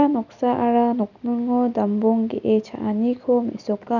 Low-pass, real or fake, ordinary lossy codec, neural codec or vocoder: 7.2 kHz; real; none; none